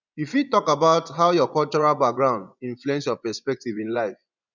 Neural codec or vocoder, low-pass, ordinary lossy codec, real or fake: vocoder, 24 kHz, 100 mel bands, Vocos; 7.2 kHz; none; fake